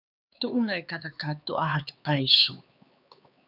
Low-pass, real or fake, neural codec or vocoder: 5.4 kHz; fake; codec, 16 kHz, 4 kbps, X-Codec, HuBERT features, trained on LibriSpeech